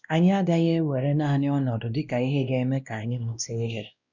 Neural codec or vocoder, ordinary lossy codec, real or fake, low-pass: codec, 16 kHz, 1 kbps, X-Codec, WavLM features, trained on Multilingual LibriSpeech; none; fake; 7.2 kHz